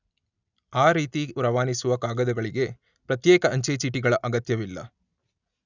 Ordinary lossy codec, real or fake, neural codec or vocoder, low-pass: none; real; none; 7.2 kHz